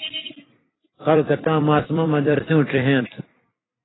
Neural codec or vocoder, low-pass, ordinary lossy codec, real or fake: vocoder, 44.1 kHz, 128 mel bands every 256 samples, BigVGAN v2; 7.2 kHz; AAC, 16 kbps; fake